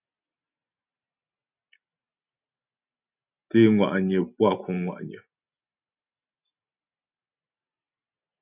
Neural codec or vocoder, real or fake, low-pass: none; real; 3.6 kHz